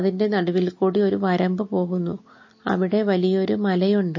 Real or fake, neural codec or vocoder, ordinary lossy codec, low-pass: real; none; MP3, 32 kbps; 7.2 kHz